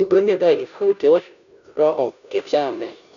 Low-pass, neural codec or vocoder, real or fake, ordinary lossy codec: 7.2 kHz; codec, 16 kHz, 0.5 kbps, FunCodec, trained on Chinese and English, 25 frames a second; fake; none